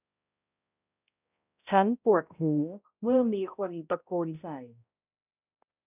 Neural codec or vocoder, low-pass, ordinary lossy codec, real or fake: codec, 16 kHz, 0.5 kbps, X-Codec, HuBERT features, trained on balanced general audio; 3.6 kHz; none; fake